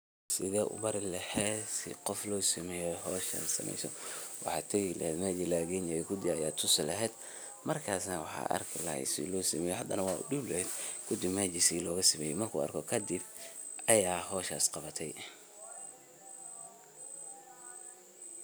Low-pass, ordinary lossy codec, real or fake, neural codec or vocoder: none; none; real; none